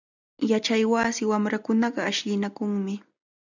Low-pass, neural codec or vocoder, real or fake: 7.2 kHz; none; real